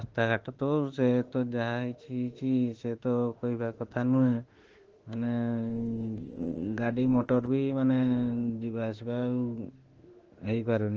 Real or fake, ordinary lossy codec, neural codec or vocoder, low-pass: fake; Opus, 16 kbps; autoencoder, 48 kHz, 32 numbers a frame, DAC-VAE, trained on Japanese speech; 7.2 kHz